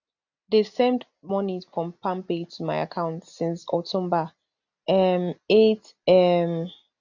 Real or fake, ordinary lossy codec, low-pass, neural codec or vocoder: real; none; 7.2 kHz; none